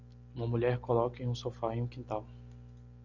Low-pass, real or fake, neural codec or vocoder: 7.2 kHz; real; none